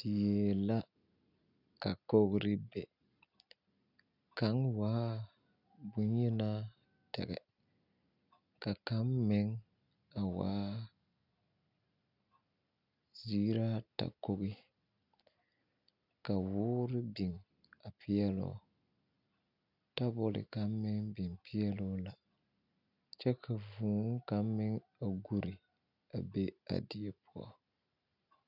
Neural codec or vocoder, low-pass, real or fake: none; 5.4 kHz; real